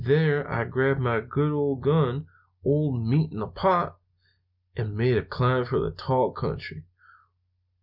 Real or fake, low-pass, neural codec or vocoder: real; 5.4 kHz; none